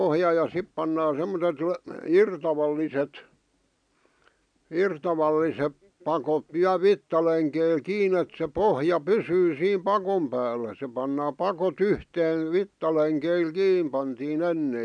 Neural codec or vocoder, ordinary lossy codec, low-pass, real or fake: none; none; 9.9 kHz; real